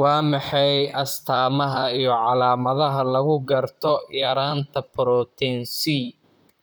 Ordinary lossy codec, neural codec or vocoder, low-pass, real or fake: none; vocoder, 44.1 kHz, 128 mel bands, Pupu-Vocoder; none; fake